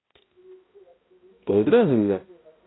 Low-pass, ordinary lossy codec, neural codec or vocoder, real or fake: 7.2 kHz; AAC, 16 kbps; codec, 16 kHz, 0.5 kbps, X-Codec, HuBERT features, trained on balanced general audio; fake